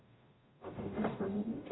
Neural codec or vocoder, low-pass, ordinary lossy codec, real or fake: codec, 44.1 kHz, 0.9 kbps, DAC; 7.2 kHz; AAC, 16 kbps; fake